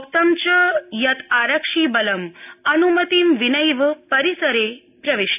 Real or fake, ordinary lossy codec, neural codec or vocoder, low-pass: real; AAC, 32 kbps; none; 3.6 kHz